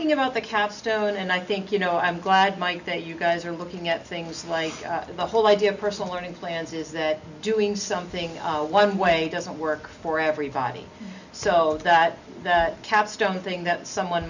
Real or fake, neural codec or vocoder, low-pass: real; none; 7.2 kHz